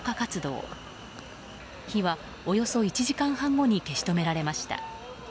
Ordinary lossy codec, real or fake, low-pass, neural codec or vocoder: none; real; none; none